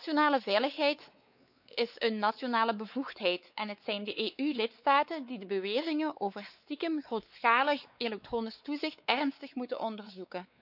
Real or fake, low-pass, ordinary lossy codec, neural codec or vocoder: fake; 5.4 kHz; none; codec, 16 kHz, 4 kbps, X-Codec, WavLM features, trained on Multilingual LibriSpeech